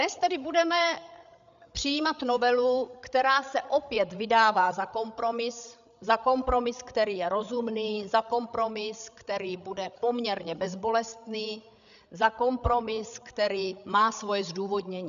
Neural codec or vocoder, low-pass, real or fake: codec, 16 kHz, 8 kbps, FreqCodec, larger model; 7.2 kHz; fake